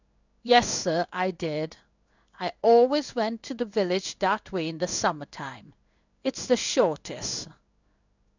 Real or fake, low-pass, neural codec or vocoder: fake; 7.2 kHz; codec, 16 kHz in and 24 kHz out, 1 kbps, XY-Tokenizer